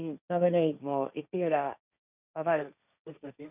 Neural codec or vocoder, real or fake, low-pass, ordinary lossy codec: codec, 16 kHz, 1.1 kbps, Voila-Tokenizer; fake; 3.6 kHz; none